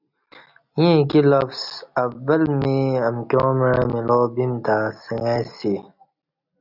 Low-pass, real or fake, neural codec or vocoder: 5.4 kHz; real; none